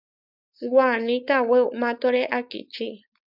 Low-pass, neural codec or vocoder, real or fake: 5.4 kHz; codec, 16 kHz, 4.8 kbps, FACodec; fake